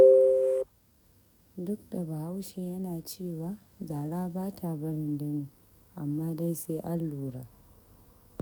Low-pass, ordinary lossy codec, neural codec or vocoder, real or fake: 19.8 kHz; none; codec, 44.1 kHz, 7.8 kbps, DAC; fake